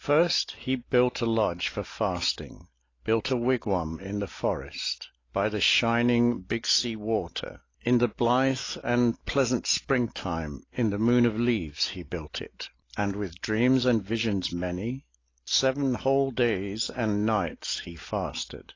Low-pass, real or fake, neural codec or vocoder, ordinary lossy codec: 7.2 kHz; real; none; AAC, 32 kbps